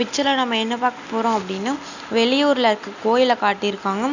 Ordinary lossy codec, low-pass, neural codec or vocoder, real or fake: none; 7.2 kHz; none; real